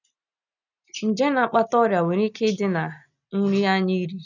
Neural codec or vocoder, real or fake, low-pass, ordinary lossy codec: none; real; 7.2 kHz; none